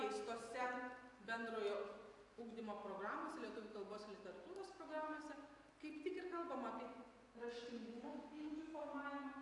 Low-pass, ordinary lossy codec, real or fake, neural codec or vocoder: 10.8 kHz; AAC, 48 kbps; real; none